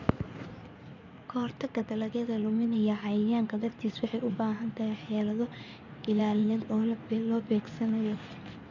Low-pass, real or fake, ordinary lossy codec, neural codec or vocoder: 7.2 kHz; fake; none; codec, 16 kHz in and 24 kHz out, 2.2 kbps, FireRedTTS-2 codec